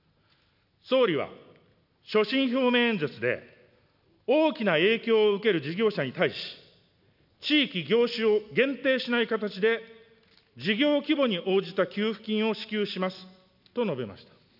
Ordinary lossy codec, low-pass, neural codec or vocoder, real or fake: none; 5.4 kHz; none; real